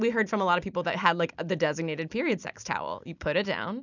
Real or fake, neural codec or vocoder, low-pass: real; none; 7.2 kHz